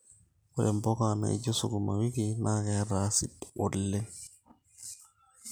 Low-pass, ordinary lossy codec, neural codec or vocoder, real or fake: none; none; none; real